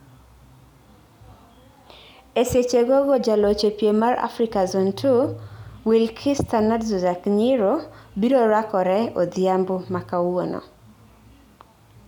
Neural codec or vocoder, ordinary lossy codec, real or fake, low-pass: none; none; real; 19.8 kHz